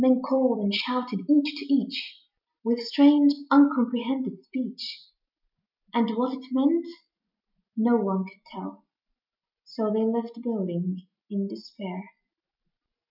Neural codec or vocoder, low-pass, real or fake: none; 5.4 kHz; real